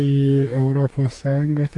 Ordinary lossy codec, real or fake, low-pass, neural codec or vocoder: AAC, 48 kbps; fake; 10.8 kHz; codec, 44.1 kHz, 2.6 kbps, DAC